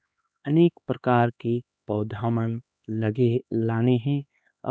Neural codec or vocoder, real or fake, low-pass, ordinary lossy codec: codec, 16 kHz, 2 kbps, X-Codec, HuBERT features, trained on LibriSpeech; fake; none; none